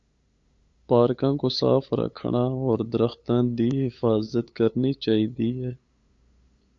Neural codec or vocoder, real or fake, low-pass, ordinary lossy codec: codec, 16 kHz, 8 kbps, FunCodec, trained on LibriTTS, 25 frames a second; fake; 7.2 kHz; Opus, 64 kbps